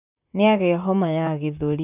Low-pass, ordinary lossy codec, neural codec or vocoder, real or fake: 3.6 kHz; none; vocoder, 44.1 kHz, 128 mel bands, Pupu-Vocoder; fake